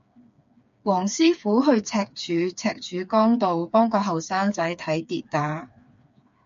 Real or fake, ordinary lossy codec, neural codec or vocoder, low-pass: fake; MP3, 48 kbps; codec, 16 kHz, 4 kbps, FreqCodec, smaller model; 7.2 kHz